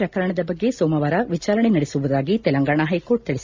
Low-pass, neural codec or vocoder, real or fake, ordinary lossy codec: 7.2 kHz; none; real; MP3, 64 kbps